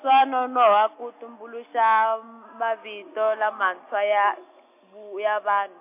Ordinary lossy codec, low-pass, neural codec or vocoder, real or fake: MP3, 24 kbps; 3.6 kHz; none; real